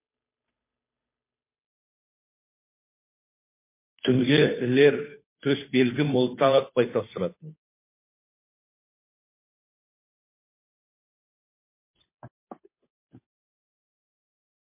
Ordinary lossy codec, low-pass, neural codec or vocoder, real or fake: MP3, 24 kbps; 3.6 kHz; codec, 16 kHz, 2 kbps, FunCodec, trained on Chinese and English, 25 frames a second; fake